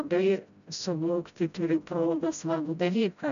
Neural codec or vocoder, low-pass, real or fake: codec, 16 kHz, 0.5 kbps, FreqCodec, smaller model; 7.2 kHz; fake